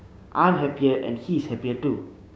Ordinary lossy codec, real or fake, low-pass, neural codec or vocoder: none; fake; none; codec, 16 kHz, 6 kbps, DAC